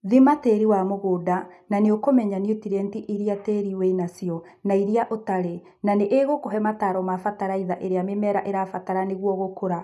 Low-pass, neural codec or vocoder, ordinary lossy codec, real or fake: 14.4 kHz; none; none; real